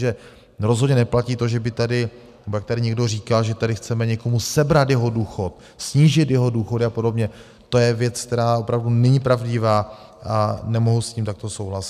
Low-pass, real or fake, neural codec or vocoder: 14.4 kHz; real; none